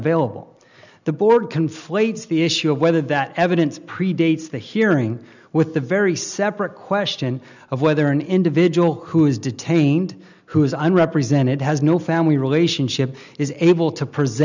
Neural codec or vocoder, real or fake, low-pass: none; real; 7.2 kHz